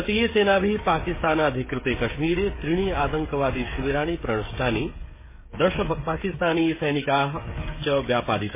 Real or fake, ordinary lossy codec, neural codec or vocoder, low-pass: fake; MP3, 16 kbps; codec, 16 kHz, 16 kbps, FreqCodec, smaller model; 3.6 kHz